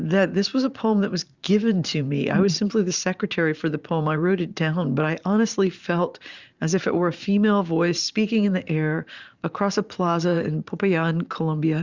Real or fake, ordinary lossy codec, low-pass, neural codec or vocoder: fake; Opus, 64 kbps; 7.2 kHz; vocoder, 44.1 kHz, 128 mel bands every 512 samples, BigVGAN v2